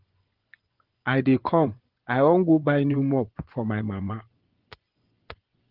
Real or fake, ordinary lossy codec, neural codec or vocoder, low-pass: fake; Opus, 16 kbps; vocoder, 24 kHz, 100 mel bands, Vocos; 5.4 kHz